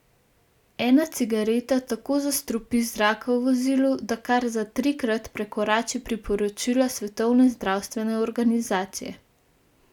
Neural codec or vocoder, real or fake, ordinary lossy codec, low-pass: none; real; none; 19.8 kHz